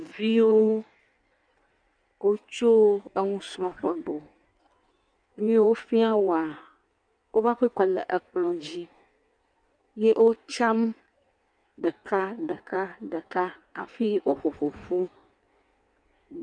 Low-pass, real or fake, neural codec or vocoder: 9.9 kHz; fake; codec, 16 kHz in and 24 kHz out, 1.1 kbps, FireRedTTS-2 codec